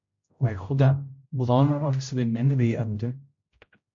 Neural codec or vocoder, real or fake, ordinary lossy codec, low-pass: codec, 16 kHz, 0.5 kbps, X-Codec, HuBERT features, trained on general audio; fake; MP3, 48 kbps; 7.2 kHz